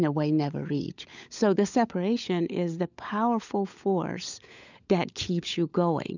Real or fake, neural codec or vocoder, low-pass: fake; codec, 16 kHz, 16 kbps, FunCodec, trained on LibriTTS, 50 frames a second; 7.2 kHz